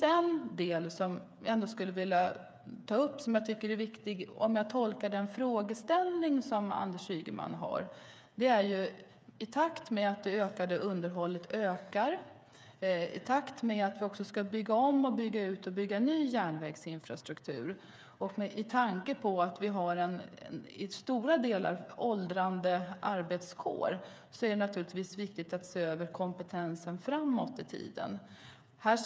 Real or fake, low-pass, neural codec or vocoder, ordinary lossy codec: fake; none; codec, 16 kHz, 8 kbps, FreqCodec, smaller model; none